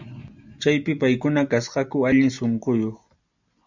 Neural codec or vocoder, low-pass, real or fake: none; 7.2 kHz; real